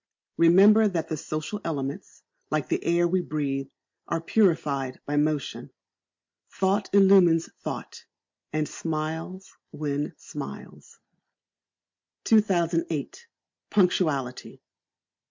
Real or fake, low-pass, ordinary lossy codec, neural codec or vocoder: real; 7.2 kHz; MP3, 48 kbps; none